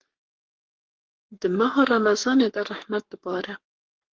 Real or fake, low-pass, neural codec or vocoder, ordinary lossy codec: fake; 7.2 kHz; codec, 44.1 kHz, 7.8 kbps, Pupu-Codec; Opus, 16 kbps